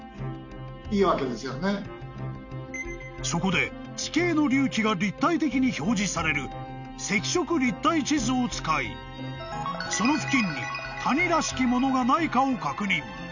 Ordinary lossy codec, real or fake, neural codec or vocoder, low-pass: none; real; none; 7.2 kHz